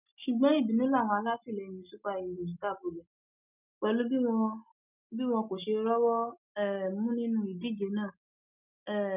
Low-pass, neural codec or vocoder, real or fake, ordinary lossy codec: 3.6 kHz; none; real; none